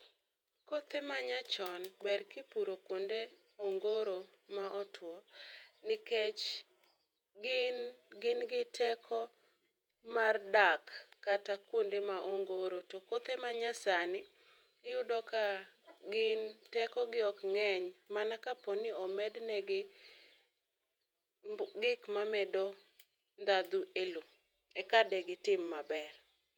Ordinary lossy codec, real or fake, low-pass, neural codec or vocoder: none; fake; 19.8 kHz; vocoder, 48 kHz, 128 mel bands, Vocos